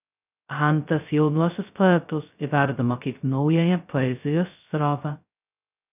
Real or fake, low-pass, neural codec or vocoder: fake; 3.6 kHz; codec, 16 kHz, 0.2 kbps, FocalCodec